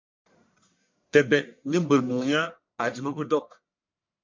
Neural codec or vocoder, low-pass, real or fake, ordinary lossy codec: codec, 44.1 kHz, 1.7 kbps, Pupu-Codec; 7.2 kHz; fake; MP3, 64 kbps